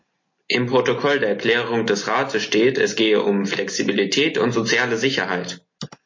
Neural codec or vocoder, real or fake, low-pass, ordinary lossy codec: none; real; 7.2 kHz; MP3, 32 kbps